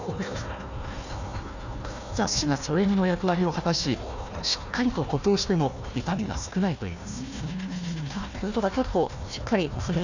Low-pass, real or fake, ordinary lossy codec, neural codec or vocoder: 7.2 kHz; fake; none; codec, 16 kHz, 1 kbps, FunCodec, trained on Chinese and English, 50 frames a second